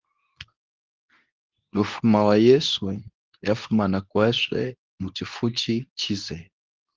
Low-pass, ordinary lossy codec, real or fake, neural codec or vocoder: 7.2 kHz; Opus, 32 kbps; fake; codec, 24 kHz, 0.9 kbps, WavTokenizer, medium speech release version 2